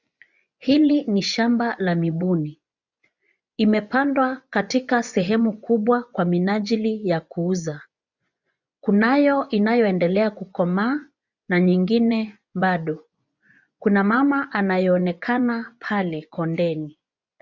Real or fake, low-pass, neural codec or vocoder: fake; 7.2 kHz; vocoder, 24 kHz, 100 mel bands, Vocos